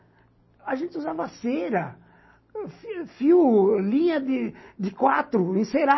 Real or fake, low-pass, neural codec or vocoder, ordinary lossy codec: real; 7.2 kHz; none; MP3, 24 kbps